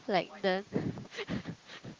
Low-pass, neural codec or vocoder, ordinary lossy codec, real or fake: 7.2 kHz; codec, 16 kHz, 6 kbps, DAC; Opus, 16 kbps; fake